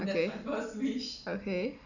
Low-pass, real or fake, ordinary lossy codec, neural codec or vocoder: 7.2 kHz; real; none; none